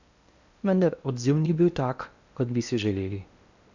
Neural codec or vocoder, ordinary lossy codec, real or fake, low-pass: codec, 16 kHz in and 24 kHz out, 0.8 kbps, FocalCodec, streaming, 65536 codes; Opus, 64 kbps; fake; 7.2 kHz